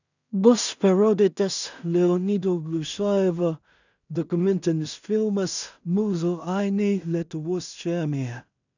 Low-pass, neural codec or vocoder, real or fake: 7.2 kHz; codec, 16 kHz in and 24 kHz out, 0.4 kbps, LongCat-Audio-Codec, two codebook decoder; fake